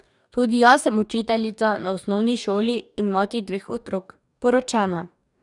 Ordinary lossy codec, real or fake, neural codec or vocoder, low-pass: none; fake; codec, 44.1 kHz, 2.6 kbps, DAC; 10.8 kHz